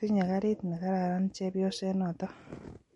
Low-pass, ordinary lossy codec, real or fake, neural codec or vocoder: 19.8 kHz; MP3, 48 kbps; real; none